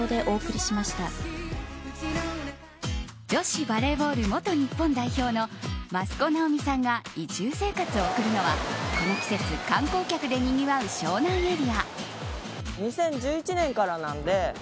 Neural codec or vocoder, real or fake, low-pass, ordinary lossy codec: none; real; none; none